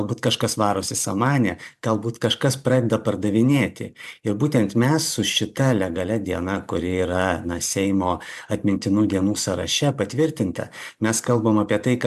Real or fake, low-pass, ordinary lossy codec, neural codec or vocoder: fake; 14.4 kHz; MP3, 96 kbps; vocoder, 44.1 kHz, 128 mel bands every 512 samples, BigVGAN v2